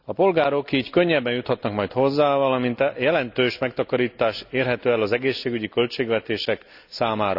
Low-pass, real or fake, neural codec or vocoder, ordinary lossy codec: 5.4 kHz; real; none; none